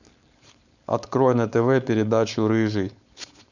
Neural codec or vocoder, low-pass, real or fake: codec, 16 kHz, 4.8 kbps, FACodec; 7.2 kHz; fake